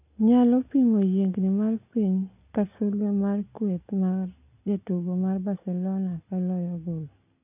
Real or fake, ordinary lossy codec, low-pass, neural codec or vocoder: real; none; 3.6 kHz; none